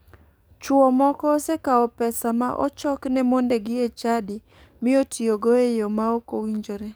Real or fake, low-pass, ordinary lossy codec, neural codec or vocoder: fake; none; none; codec, 44.1 kHz, 7.8 kbps, DAC